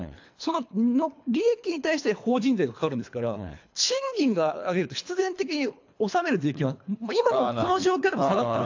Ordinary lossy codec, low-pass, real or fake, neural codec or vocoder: AAC, 48 kbps; 7.2 kHz; fake; codec, 24 kHz, 3 kbps, HILCodec